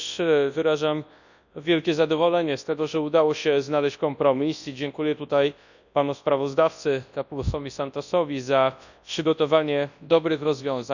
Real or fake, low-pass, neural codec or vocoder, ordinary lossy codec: fake; 7.2 kHz; codec, 24 kHz, 0.9 kbps, WavTokenizer, large speech release; none